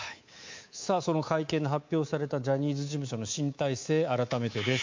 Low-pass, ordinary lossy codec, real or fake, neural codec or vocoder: 7.2 kHz; MP3, 48 kbps; fake; codec, 24 kHz, 3.1 kbps, DualCodec